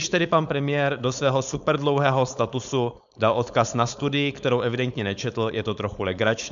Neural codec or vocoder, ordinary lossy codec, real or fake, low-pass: codec, 16 kHz, 4.8 kbps, FACodec; AAC, 96 kbps; fake; 7.2 kHz